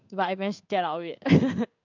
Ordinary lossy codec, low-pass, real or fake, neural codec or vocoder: none; 7.2 kHz; fake; codec, 16 kHz in and 24 kHz out, 1 kbps, XY-Tokenizer